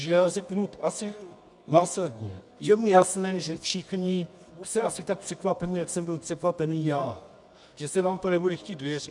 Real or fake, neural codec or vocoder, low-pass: fake; codec, 24 kHz, 0.9 kbps, WavTokenizer, medium music audio release; 10.8 kHz